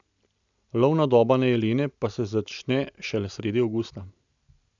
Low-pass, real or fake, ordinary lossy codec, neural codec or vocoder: 7.2 kHz; real; none; none